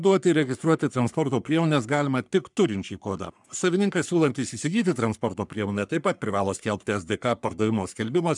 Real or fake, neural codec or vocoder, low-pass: fake; codec, 44.1 kHz, 3.4 kbps, Pupu-Codec; 10.8 kHz